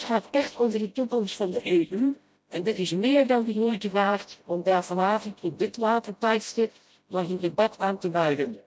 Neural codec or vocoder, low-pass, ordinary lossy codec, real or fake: codec, 16 kHz, 0.5 kbps, FreqCodec, smaller model; none; none; fake